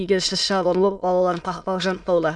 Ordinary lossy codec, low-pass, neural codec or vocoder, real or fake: none; 9.9 kHz; autoencoder, 22.05 kHz, a latent of 192 numbers a frame, VITS, trained on many speakers; fake